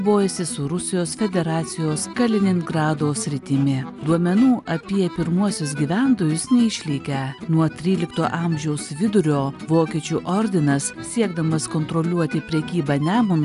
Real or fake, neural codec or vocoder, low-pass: real; none; 10.8 kHz